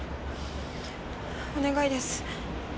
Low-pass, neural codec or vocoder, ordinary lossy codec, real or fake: none; none; none; real